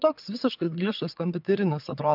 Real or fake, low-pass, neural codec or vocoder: fake; 5.4 kHz; vocoder, 22.05 kHz, 80 mel bands, WaveNeXt